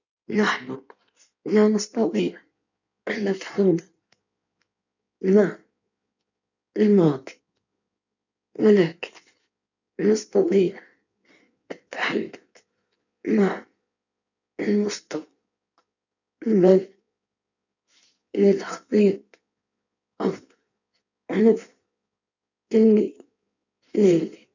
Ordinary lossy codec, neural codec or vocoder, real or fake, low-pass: none; codec, 16 kHz in and 24 kHz out, 1.1 kbps, FireRedTTS-2 codec; fake; 7.2 kHz